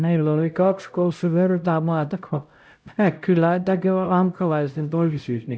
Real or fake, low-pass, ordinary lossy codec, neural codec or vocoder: fake; none; none; codec, 16 kHz, 0.5 kbps, X-Codec, HuBERT features, trained on LibriSpeech